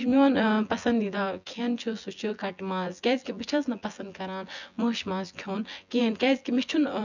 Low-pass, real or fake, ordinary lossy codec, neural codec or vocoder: 7.2 kHz; fake; none; vocoder, 24 kHz, 100 mel bands, Vocos